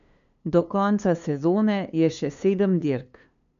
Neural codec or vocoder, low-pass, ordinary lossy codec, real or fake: codec, 16 kHz, 2 kbps, FunCodec, trained on LibriTTS, 25 frames a second; 7.2 kHz; none; fake